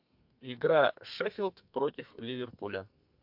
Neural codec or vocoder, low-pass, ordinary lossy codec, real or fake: codec, 32 kHz, 1.9 kbps, SNAC; 5.4 kHz; MP3, 48 kbps; fake